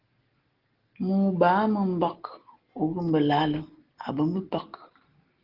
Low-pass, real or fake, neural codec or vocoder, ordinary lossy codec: 5.4 kHz; real; none; Opus, 16 kbps